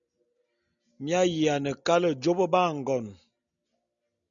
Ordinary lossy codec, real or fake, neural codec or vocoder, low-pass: MP3, 96 kbps; real; none; 7.2 kHz